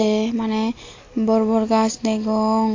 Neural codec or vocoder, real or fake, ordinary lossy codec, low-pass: none; real; AAC, 32 kbps; 7.2 kHz